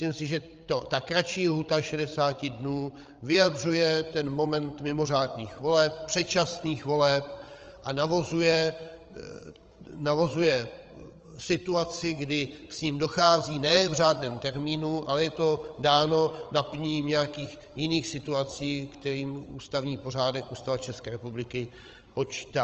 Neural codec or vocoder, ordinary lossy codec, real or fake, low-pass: codec, 16 kHz, 8 kbps, FreqCodec, larger model; Opus, 24 kbps; fake; 7.2 kHz